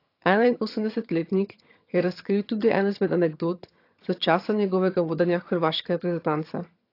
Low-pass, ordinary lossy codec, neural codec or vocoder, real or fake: 5.4 kHz; AAC, 32 kbps; vocoder, 22.05 kHz, 80 mel bands, HiFi-GAN; fake